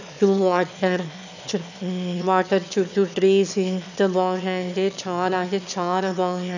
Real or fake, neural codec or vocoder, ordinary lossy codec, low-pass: fake; autoencoder, 22.05 kHz, a latent of 192 numbers a frame, VITS, trained on one speaker; none; 7.2 kHz